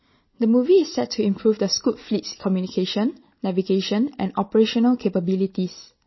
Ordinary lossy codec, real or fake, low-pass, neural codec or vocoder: MP3, 24 kbps; real; 7.2 kHz; none